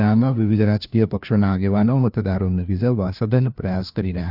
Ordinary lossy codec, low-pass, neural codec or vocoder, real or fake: none; 5.4 kHz; codec, 16 kHz, 1 kbps, FunCodec, trained on LibriTTS, 50 frames a second; fake